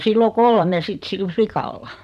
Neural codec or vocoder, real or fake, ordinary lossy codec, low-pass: vocoder, 44.1 kHz, 128 mel bands every 512 samples, BigVGAN v2; fake; none; 14.4 kHz